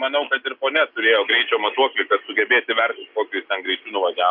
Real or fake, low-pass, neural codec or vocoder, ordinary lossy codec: real; 5.4 kHz; none; Opus, 64 kbps